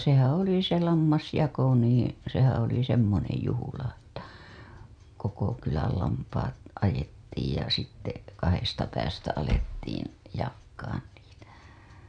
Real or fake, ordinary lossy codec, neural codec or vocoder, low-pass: real; none; none; 9.9 kHz